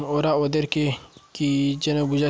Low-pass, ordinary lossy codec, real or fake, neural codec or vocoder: none; none; real; none